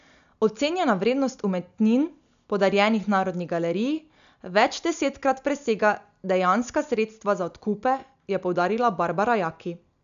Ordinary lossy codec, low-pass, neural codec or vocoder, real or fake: none; 7.2 kHz; none; real